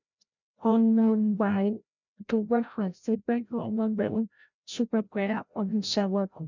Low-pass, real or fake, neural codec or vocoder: 7.2 kHz; fake; codec, 16 kHz, 0.5 kbps, FreqCodec, larger model